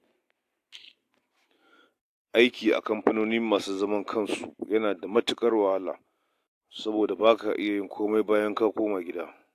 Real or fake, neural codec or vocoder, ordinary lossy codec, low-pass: real; none; AAC, 64 kbps; 14.4 kHz